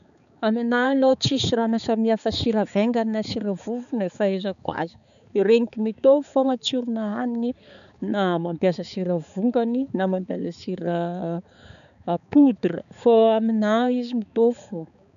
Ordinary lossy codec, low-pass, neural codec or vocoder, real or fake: none; 7.2 kHz; codec, 16 kHz, 4 kbps, X-Codec, HuBERT features, trained on balanced general audio; fake